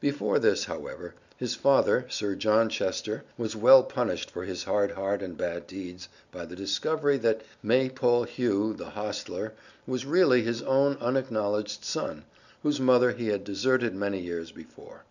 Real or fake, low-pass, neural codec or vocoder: real; 7.2 kHz; none